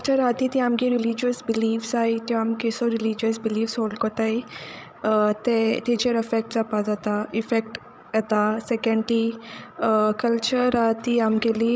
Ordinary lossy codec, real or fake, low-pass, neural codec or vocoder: none; fake; none; codec, 16 kHz, 16 kbps, FreqCodec, larger model